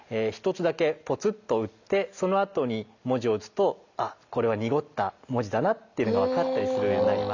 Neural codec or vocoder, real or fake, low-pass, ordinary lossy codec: none; real; 7.2 kHz; none